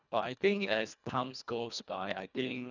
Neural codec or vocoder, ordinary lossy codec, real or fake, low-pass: codec, 24 kHz, 1.5 kbps, HILCodec; none; fake; 7.2 kHz